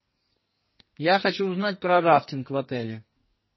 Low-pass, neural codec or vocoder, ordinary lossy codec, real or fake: 7.2 kHz; codec, 44.1 kHz, 2.6 kbps, SNAC; MP3, 24 kbps; fake